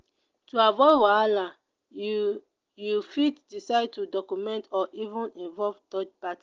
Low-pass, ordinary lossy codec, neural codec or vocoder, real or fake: 7.2 kHz; Opus, 32 kbps; none; real